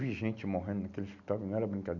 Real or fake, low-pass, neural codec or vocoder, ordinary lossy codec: real; 7.2 kHz; none; none